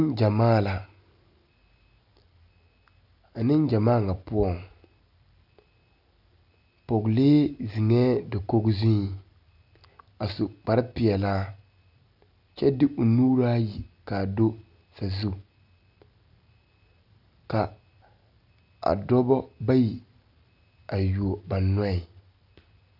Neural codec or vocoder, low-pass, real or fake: none; 5.4 kHz; real